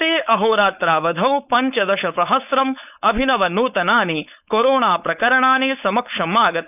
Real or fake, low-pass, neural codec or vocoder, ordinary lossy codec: fake; 3.6 kHz; codec, 16 kHz, 4.8 kbps, FACodec; none